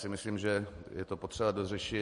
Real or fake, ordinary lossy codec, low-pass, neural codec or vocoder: real; MP3, 48 kbps; 10.8 kHz; none